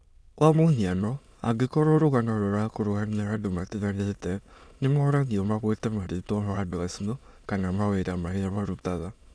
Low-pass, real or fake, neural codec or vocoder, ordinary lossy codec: none; fake; autoencoder, 22.05 kHz, a latent of 192 numbers a frame, VITS, trained on many speakers; none